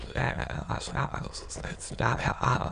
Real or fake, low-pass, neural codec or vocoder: fake; 9.9 kHz; autoencoder, 22.05 kHz, a latent of 192 numbers a frame, VITS, trained on many speakers